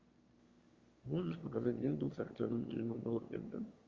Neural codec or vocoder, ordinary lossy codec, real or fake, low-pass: autoencoder, 22.05 kHz, a latent of 192 numbers a frame, VITS, trained on one speaker; Opus, 32 kbps; fake; 7.2 kHz